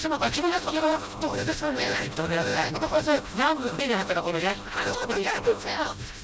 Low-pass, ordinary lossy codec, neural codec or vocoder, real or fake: none; none; codec, 16 kHz, 0.5 kbps, FreqCodec, smaller model; fake